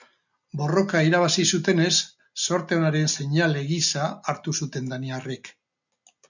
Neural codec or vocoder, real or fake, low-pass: none; real; 7.2 kHz